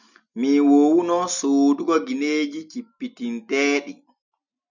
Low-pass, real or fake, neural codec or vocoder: 7.2 kHz; real; none